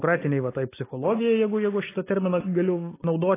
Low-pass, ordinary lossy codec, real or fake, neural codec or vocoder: 3.6 kHz; AAC, 16 kbps; real; none